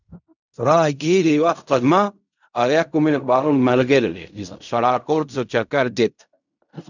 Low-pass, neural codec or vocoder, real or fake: 7.2 kHz; codec, 16 kHz in and 24 kHz out, 0.4 kbps, LongCat-Audio-Codec, fine tuned four codebook decoder; fake